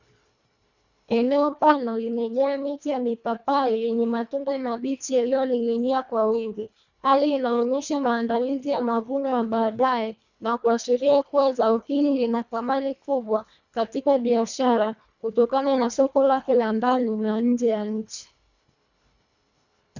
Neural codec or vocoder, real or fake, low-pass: codec, 24 kHz, 1.5 kbps, HILCodec; fake; 7.2 kHz